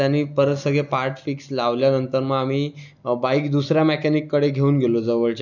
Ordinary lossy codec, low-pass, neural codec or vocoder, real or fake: none; 7.2 kHz; none; real